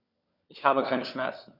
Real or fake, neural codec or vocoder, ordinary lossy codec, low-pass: fake; codec, 16 kHz, 4 kbps, FunCodec, trained on LibriTTS, 50 frames a second; none; 5.4 kHz